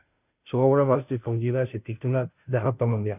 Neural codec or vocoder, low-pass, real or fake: codec, 16 kHz, 0.5 kbps, FunCodec, trained on Chinese and English, 25 frames a second; 3.6 kHz; fake